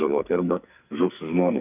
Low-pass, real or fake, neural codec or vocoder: 3.6 kHz; fake; codec, 44.1 kHz, 2.6 kbps, SNAC